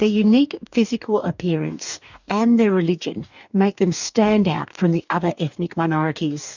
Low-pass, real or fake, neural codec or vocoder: 7.2 kHz; fake; codec, 44.1 kHz, 2.6 kbps, DAC